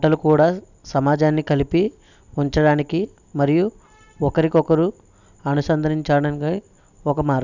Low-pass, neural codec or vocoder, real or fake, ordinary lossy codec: 7.2 kHz; none; real; none